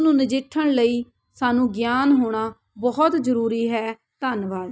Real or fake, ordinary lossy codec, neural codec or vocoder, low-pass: real; none; none; none